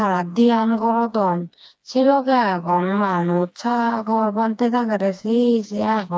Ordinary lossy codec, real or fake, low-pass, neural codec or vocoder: none; fake; none; codec, 16 kHz, 2 kbps, FreqCodec, smaller model